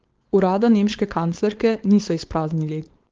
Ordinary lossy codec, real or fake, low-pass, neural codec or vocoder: Opus, 16 kbps; fake; 7.2 kHz; codec, 16 kHz, 4.8 kbps, FACodec